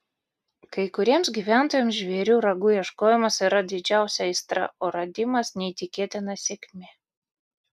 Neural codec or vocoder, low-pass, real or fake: none; 14.4 kHz; real